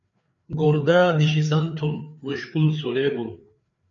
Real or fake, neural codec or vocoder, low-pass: fake; codec, 16 kHz, 4 kbps, FreqCodec, larger model; 7.2 kHz